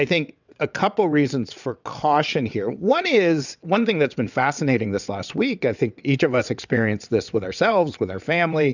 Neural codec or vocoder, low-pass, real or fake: vocoder, 22.05 kHz, 80 mel bands, WaveNeXt; 7.2 kHz; fake